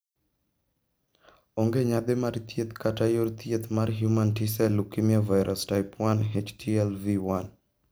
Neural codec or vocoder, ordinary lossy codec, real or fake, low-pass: none; none; real; none